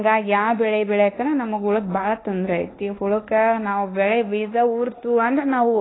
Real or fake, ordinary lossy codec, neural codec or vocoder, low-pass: fake; AAC, 16 kbps; codec, 24 kHz, 1.2 kbps, DualCodec; 7.2 kHz